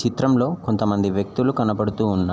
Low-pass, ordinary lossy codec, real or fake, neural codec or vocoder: none; none; real; none